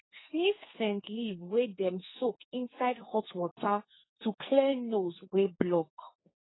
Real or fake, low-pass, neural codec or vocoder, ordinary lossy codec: fake; 7.2 kHz; codec, 44.1 kHz, 2.6 kbps, SNAC; AAC, 16 kbps